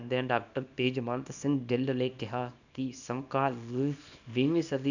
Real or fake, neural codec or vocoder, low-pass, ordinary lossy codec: fake; codec, 24 kHz, 0.9 kbps, WavTokenizer, small release; 7.2 kHz; none